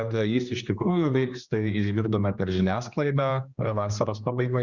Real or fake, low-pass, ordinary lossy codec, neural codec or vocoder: fake; 7.2 kHz; Opus, 64 kbps; codec, 16 kHz, 2 kbps, X-Codec, HuBERT features, trained on general audio